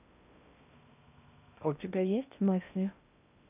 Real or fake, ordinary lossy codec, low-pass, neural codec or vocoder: fake; none; 3.6 kHz; codec, 16 kHz in and 24 kHz out, 0.6 kbps, FocalCodec, streaming, 2048 codes